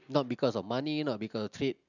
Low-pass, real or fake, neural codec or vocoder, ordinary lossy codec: 7.2 kHz; real; none; none